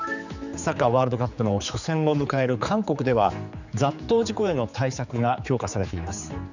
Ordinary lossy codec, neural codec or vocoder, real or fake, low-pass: none; codec, 16 kHz, 4 kbps, X-Codec, HuBERT features, trained on general audio; fake; 7.2 kHz